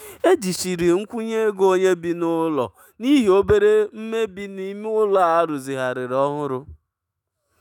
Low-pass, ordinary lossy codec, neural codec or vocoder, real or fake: 19.8 kHz; none; autoencoder, 48 kHz, 128 numbers a frame, DAC-VAE, trained on Japanese speech; fake